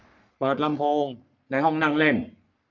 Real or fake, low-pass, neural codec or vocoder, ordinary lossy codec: fake; 7.2 kHz; codec, 44.1 kHz, 3.4 kbps, Pupu-Codec; none